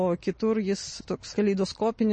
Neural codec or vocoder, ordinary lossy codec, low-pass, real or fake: none; MP3, 32 kbps; 10.8 kHz; real